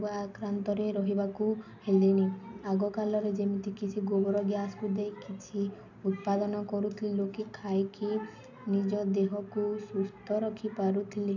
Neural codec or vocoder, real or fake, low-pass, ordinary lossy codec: none; real; 7.2 kHz; none